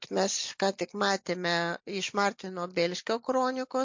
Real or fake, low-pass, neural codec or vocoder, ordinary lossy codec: real; 7.2 kHz; none; MP3, 48 kbps